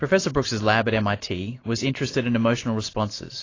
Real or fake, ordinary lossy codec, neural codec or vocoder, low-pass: real; AAC, 32 kbps; none; 7.2 kHz